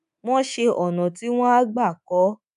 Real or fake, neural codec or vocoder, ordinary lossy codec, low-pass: fake; codec, 24 kHz, 3.1 kbps, DualCodec; MP3, 96 kbps; 10.8 kHz